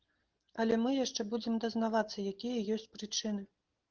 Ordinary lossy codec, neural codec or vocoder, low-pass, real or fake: Opus, 16 kbps; none; 7.2 kHz; real